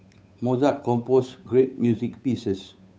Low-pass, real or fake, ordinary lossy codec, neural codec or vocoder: none; fake; none; codec, 16 kHz, 8 kbps, FunCodec, trained on Chinese and English, 25 frames a second